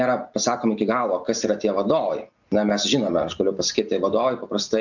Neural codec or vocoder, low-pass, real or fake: none; 7.2 kHz; real